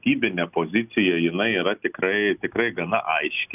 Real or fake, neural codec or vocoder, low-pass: real; none; 3.6 kHz